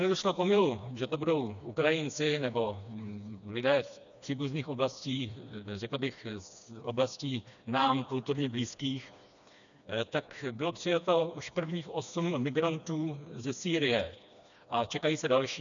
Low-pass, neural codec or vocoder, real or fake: 7.2 kHz; codec, 16 kHz, 2 kbps, FreqCodec, smaller model; fake